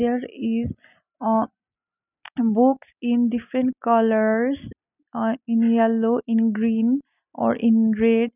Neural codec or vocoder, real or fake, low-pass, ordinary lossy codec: none; real; 3.6 kHz; none